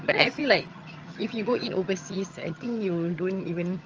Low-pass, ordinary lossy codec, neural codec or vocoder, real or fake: 7.2 kHz; Opus, 24 kbps; vocoder, 22.05 kHz, 80 mel bands, HiFi-GAN; fake